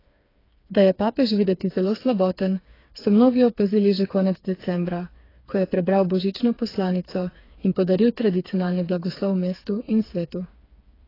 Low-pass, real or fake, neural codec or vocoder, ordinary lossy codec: 5.4 kHz; fake; codec, 16 kHz, 4 kbps, FreqCodec, smaller model; AAC, 24 kbps